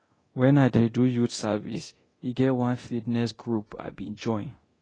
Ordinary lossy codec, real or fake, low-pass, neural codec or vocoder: AAC, 32 kbps; fake; 9.9 kHz; codec, 24 kHz, 0.9 kbps, DualCodec